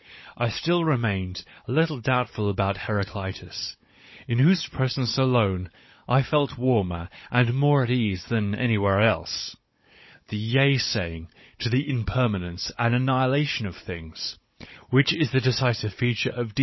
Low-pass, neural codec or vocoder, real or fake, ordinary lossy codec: 7.2 kHz; codec, 16 kHz, 16 kbps, FunCodec, trained on Chinese and English, 50 frames a second; fake; MP3, 24 kbps